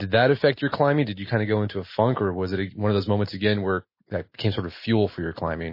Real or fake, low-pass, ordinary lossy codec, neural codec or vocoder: real; 5.4 kHz; MP3, 24 kbps; none